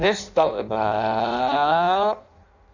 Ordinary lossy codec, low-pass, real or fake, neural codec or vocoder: none; 7.2 kHz; fake; codec, 16 kHz in and 24 kHz out, 0.6 kbps, FireRedTTS-2 codec